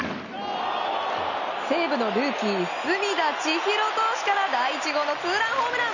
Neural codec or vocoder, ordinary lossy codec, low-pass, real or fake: none; none; 7.2 kHz; real